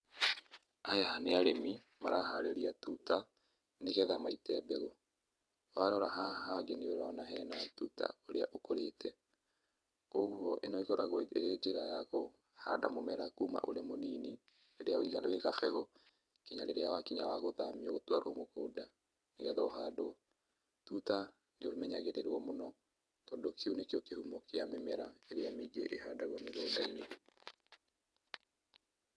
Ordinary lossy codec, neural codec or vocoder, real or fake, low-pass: none; vocoder, 22.05 kHz, 80 mel bands, WaveNeXt; fake; none